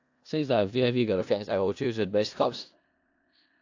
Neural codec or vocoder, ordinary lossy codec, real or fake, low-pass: codec, 16 kHz in and 24 kHz out, 0.4 kbps, LongCat-Audio-Codec, four codebook decoder; AAC, 48 kbps; fake; 7.2 kHz